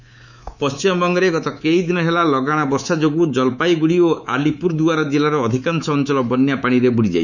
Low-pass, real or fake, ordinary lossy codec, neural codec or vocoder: 7.2 kHz; fake; none; codec, 24 kHz, 3.1 kbps, DualCodec